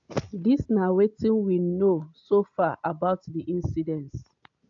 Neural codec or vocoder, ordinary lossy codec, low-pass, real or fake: none; AAC, 64 kbps; 7.2 kHz; real